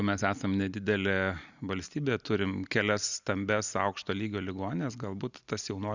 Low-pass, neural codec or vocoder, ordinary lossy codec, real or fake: 7.2 kHz; none; Opus, 64 kbps; real